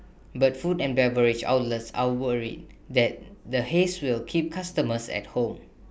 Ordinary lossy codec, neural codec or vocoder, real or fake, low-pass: none; none; real; none